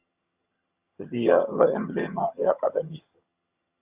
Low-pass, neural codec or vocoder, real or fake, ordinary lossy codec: 3.6 kHz; vocoder, 22.05 kHz, 80 mel bands, HiFi-GAN; fake; Opus, 64 kbps